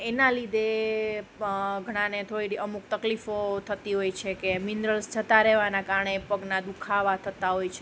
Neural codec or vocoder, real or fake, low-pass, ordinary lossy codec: none; real; none; none